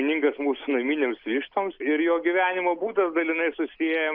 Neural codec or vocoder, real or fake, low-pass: none; real; 5.4 kHz